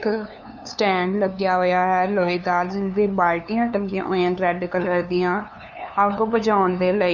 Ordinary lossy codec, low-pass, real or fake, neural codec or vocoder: none; 7.2 kHz; fake; codec, 16 kHz, 2 kbps, FunCodec, trained on LibriTTS, 25 frames a second